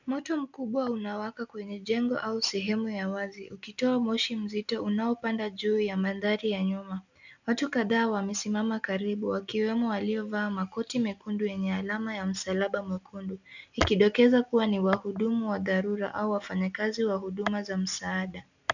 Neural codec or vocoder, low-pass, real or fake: none; 7.2 kHz; real